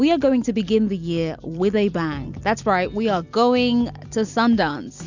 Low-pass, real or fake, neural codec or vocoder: 7.2 kHz; real; none